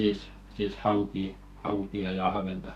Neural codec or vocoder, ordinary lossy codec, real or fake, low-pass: codec, 32 kHz, 1.9 kbps, SNAC; none; fake; 14.4 kHz